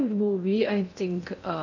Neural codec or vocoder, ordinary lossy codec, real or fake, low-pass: codec, 16 kHz in and 24 kHz out, 0.6 kbps, FocalCodec, streaming, 2048 codes; none; fake; 7.2 kHz